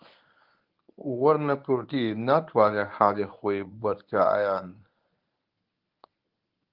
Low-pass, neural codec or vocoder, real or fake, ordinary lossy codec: 5.4 kHz; codec, 24 kHz, 0.9 kbps, WavTokenizer, medium speech release version 1; fake; Opus, 32 kbps